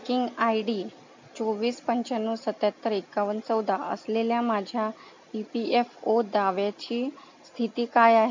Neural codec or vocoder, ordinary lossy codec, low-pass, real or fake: none; MP3, 64 kbps; 7.2 kHz; real